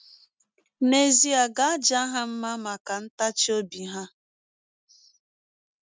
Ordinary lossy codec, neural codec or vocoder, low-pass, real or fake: none; none; none; real